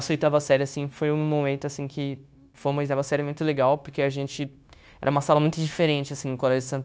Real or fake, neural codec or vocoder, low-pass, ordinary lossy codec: fake; codec, 16 kHz, 0.9 kbps, LongCat-Audio-Codec; none; none